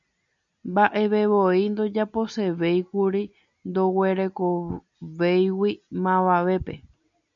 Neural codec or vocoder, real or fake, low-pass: none; real; 7.2 kHz